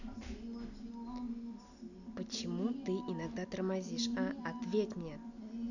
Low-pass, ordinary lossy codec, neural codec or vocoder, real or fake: 7.2 kHz; none; none; real